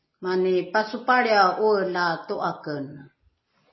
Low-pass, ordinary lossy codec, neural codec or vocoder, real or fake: 7.2 kHz; MP3, 24 kbps; none; real